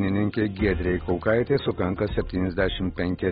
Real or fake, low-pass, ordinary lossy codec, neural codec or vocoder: real; 14.4 kHz; AAC, 16 kbps; none